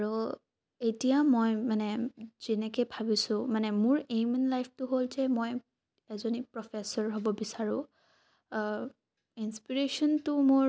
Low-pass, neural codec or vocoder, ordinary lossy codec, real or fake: none; none; none; real